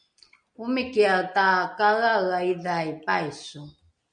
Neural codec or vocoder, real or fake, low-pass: none; real; 9.9 kHz